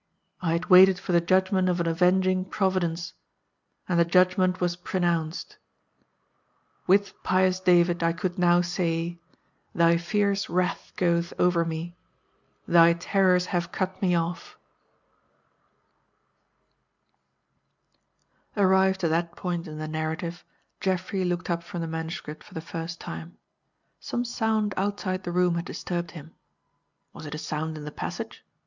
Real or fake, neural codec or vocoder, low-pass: real; none; 7.2 kHz